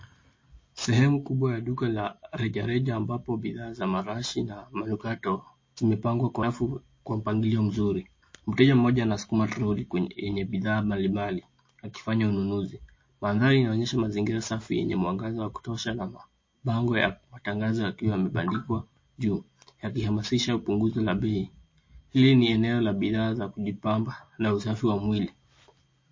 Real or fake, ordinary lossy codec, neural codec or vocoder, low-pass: real; MP3, 32 kbps; none; 7.2 kHz